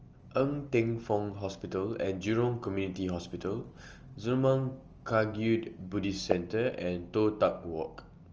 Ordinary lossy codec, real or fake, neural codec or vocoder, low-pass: Opus, 24 kbps; real; none; 7.2 kHz